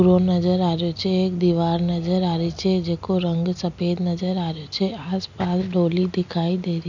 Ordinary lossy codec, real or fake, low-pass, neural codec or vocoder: none; real; 7.2 kHz; none